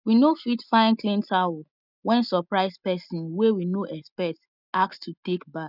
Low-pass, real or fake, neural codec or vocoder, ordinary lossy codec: 5.4 kHz; real; none; none